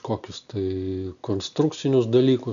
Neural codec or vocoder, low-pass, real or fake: none; 7.2 kHz; real